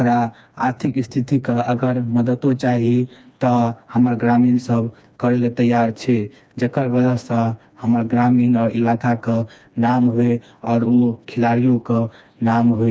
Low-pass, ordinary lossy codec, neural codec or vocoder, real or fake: none; none; codec, 16 kHz, 2 kbps, FreqCodec, smaller model; fake